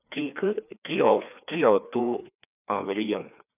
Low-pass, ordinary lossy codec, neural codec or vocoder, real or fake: 3.6 kHz; none; codec, 16 kHz, 2 kbps, FreqCodec, larger model; fake